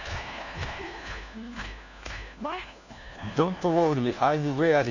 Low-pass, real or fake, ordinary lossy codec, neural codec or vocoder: 7.2 kHz; fake; none; codec, 16 kHz, 1 kbps, FunCodec, trained on LibriTTS, 50 frames a second